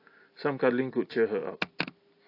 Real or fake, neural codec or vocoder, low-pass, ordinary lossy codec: real; none; 5.4 kHz; AAC, 32 kbps